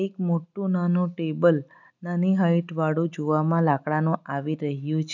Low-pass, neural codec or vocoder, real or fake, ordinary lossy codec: 7.2 kHz; none; real; none